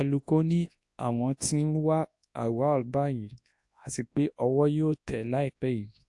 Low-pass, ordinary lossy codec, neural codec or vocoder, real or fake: 10.8 kHz; AAC, 64 kbps; codec, 24 kHz, 0.9 kbps, WavTokenizer, large speech release; fake